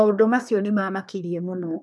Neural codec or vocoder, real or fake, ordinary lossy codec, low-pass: codec, 24 kHz, 1 kbps, SNAC; fake; none; none